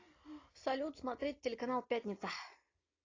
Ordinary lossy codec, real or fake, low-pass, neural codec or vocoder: AAC, 32 kbps; real; 7.2 kHz; none